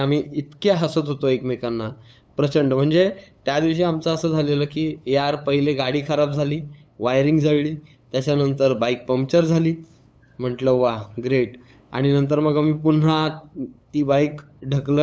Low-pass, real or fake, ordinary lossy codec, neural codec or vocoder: none; fake; none; codec, 16 kHz, 8 kbps, FunCodec, trained on LibriTTS, 25 frames a second